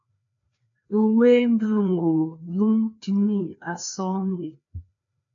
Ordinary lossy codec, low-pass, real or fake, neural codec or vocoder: AAC, 64 kbps; 7.2 kHz; fake; codec, 16 kHz, 2 kbps, FreqCodec, larger model